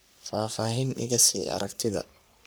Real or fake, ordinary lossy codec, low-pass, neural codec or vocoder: fake; none; none; codec, 44.1 kHz, 3.4 kbps, Pupu-Codec